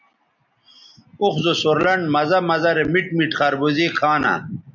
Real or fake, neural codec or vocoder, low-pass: real; none; 7.2 kHz